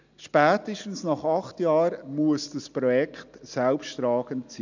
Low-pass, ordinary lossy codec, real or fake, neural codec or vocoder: 7.2 kHz; none; real; none